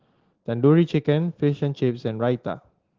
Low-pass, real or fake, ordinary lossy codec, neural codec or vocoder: 7.2 kHz; real; Opus, 16 kbps; none